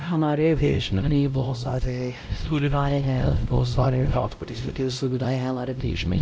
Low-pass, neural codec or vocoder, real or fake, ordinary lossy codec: none; codec, 16 kHz, 0.5 kbps, X-Codec, WavLM features, trained on Multilingual LibriSpeech; fake; none